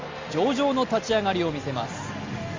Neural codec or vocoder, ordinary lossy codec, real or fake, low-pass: none; Opus, 32 kbps; real; 7.2 kHz